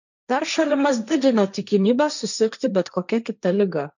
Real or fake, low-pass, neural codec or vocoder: fake; 7.2 kHz; codec, 16 kHz, 1.1 kbps, Voila-Tokenizer